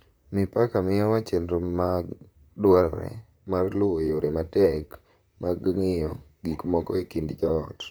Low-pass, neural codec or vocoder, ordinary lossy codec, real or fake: none; vocoder, 44.1 kHz, 128 mel bands, Pupu-Vocoder; none; fake